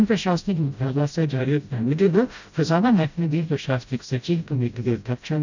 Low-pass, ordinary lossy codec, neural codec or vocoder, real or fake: 7.2 kHz; none; codec, 16 kHz, 0.5 kbps, FreqCodec, smaller model; fake